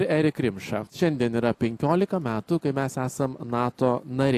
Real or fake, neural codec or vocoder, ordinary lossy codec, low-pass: real; none; AAC, 64 kbps; 14.4 kHz